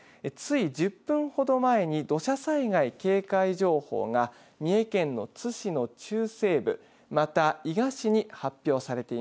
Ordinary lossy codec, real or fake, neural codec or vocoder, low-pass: none; real; none; none